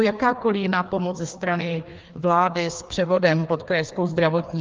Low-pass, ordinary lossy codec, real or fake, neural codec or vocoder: 7.2 kHz; Opus, 32 kbps; fake; codec, 16 kHz, 2 kbps, FreqCodec, larger model